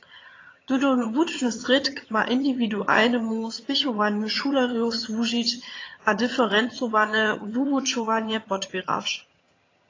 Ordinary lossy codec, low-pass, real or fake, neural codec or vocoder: AAC, 32 kbps; 7.2 kHz; fake; vocoder, 22.05 kHz, 80 mel bands, HiFi-GAN